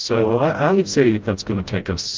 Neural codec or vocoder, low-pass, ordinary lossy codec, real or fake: codec, 16 kHz, 0.5 kbps, FreqCodec, smaller model; 7.2 kHz; Opus, 32 kbps; fake